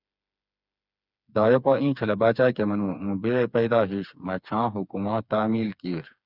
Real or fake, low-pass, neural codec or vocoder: fake; 5.4 kHz; codec, 16 kHz, 4 kbps, FreqCodec, smaller model